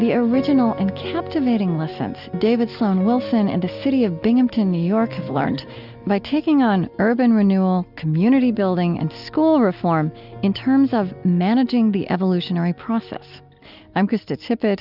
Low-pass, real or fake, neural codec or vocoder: 5.4 kHz; real; none